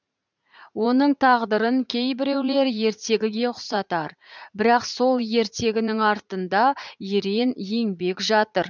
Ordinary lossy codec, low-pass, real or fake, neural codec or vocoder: none; 7.2 kHz; fake; vocoder, 44.1 kHz, 80 mel bands, Vocos